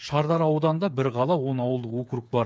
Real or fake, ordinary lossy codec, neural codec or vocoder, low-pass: fake; none; codec, 16 kHz, 8 kbps, FreqCodec, smaller model; none